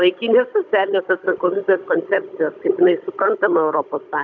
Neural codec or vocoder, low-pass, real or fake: codec, 16 kHz, 16 kbps, FunCodec, trained on Chinese and English, 50 frames a second; 7.2 kHz; fake